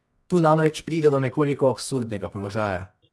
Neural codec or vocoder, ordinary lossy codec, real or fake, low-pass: codec, 24 kHz, 0.9 kbps, WavTokenizer, medium music audio release; none; fake; none